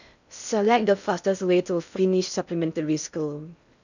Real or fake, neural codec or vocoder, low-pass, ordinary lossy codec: fake; codec, 16 kHz in and 24 kHz out, 0.6 kbps, FocalCodec, streaming, 4096 codes; 7.2 kHz; none